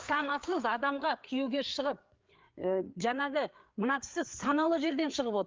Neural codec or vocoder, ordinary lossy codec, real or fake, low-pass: codec, 16 kHz, 4 kbps, FreqCodec, larger model; Opus, 24 kbps; fake; 7.2 kHz